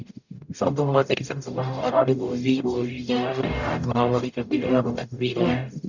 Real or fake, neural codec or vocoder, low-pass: fake; codec, 44.1 kHz, 0.9 kbps, DAC; 7.2 kHz